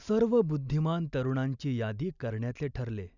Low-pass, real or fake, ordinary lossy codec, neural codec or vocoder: 7.2 kHz; real; none; none